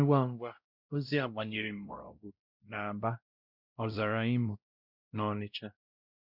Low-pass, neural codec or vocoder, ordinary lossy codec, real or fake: 5.4 kHz; codec, 16 kHz, 0.5 kbps, X-Codec, WavLM features, trained on Multilingual LibriSpeech; none; fake